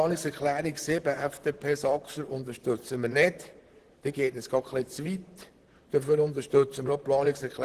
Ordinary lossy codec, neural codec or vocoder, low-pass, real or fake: Opus, 16 kbps; vocoder, 44.1 kHz, 128 mel bands, Pupu-Vocoder; 14.4 kHz; fake